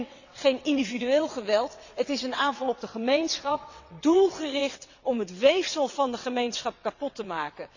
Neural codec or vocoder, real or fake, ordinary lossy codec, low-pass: vocoder, 22.05 kHz, 80 mel bands, WaveNeXt; fake; none; 7.2 kHz